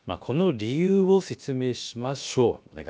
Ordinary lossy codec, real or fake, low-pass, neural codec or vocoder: none; fake; none; codec, 16 kHz, about 1 kbps, DyCAST, with the encoder's durations